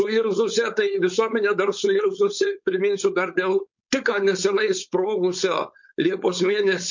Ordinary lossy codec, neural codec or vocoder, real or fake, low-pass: MP3, 48 kbps; codec, 16 kHz, 4.8 kbps, FACodec; fake; 7.2 kHz